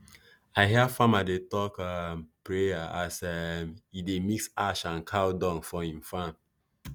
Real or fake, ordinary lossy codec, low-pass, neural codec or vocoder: real; none; none; none